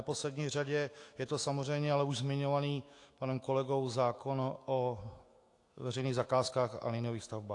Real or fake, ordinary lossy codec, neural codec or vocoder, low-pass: fake; AAC, 48 kbps; autoencoder, 48 kHz, 128 numbers a frame, DAC-VAE, trained on Japanese speech; 10.8 kHz